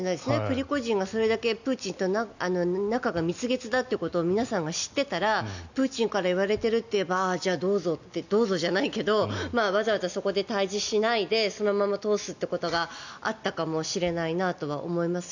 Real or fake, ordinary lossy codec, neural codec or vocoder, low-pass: real; none; none; 7.2 kHz